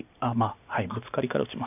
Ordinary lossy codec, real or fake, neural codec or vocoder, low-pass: none; real; none; 3.6 kHz